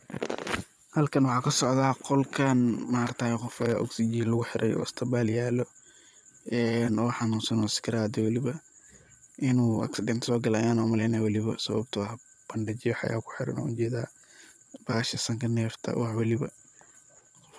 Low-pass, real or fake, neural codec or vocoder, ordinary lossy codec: none; fake; vocoder, 22.05 kHz, 80 mel bands, Vocos; none